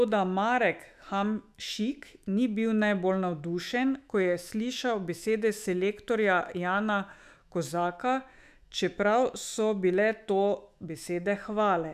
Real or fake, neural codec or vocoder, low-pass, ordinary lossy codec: fake; autoencoder, 48 kHz, 128 numbers a frame, DAC-VAE, trained on Japanese speech; 14.4 kHz; none